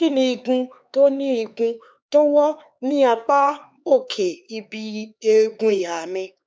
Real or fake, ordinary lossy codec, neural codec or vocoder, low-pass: fake; none; codec, 16 kHz, 4 kbps, X-Codec, HuBERT features, trained on LibriSpeech; none